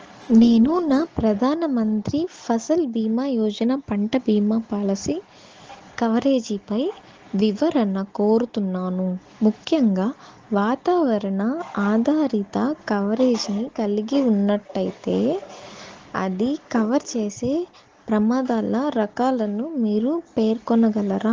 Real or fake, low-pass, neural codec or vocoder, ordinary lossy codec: real; 7.2 kHz; none; Opus, 16 kbps